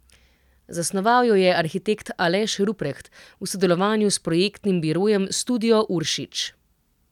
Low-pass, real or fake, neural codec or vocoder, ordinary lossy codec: 19.8 kHz; real; none; none